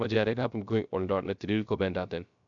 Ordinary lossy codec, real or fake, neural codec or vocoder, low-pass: MP3, 96 kbps; fake; codec, 16 kHz, 0.3 kbps, FocalCodec; 7.2 kHz